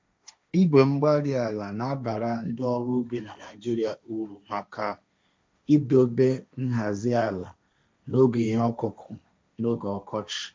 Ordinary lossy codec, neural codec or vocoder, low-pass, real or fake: none; codec, 16 kHz, 1.1 kbps, Voila-Tokenizer; none; fake